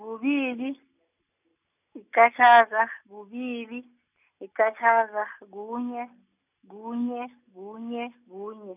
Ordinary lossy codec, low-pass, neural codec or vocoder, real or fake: none; 3.6 kHz; none; real